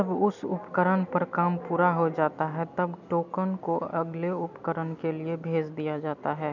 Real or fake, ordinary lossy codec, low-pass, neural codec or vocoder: real; none; 7.2 kHz; none